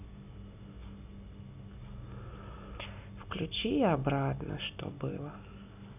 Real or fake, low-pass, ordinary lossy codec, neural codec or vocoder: real; 3.6 kHz; none; none